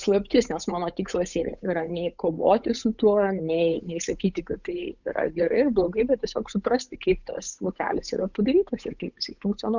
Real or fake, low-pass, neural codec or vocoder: fake; 7.2 kHz; codec, 16 kHz, 8 kbps, FunCodec, trained on Chinese and English, 25 frames a second